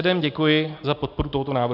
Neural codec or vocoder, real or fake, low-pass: none; real; 5.4 kHz